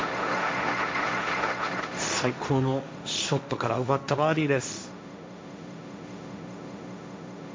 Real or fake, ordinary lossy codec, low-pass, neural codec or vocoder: fake; none; none; codec, 16 kHz, 1.1 kbps, Voila-Tokenizer